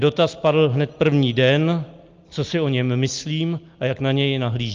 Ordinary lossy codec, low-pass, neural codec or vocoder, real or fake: Opus, 24 kbps; 7.2 kHz; none; real